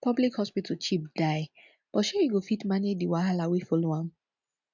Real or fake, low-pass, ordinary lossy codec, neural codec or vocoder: real; 7.2 kHz; none; none